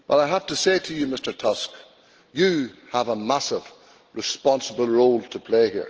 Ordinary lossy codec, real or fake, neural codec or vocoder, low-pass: Opus, 16 kbps; real; none; 7.2 kHz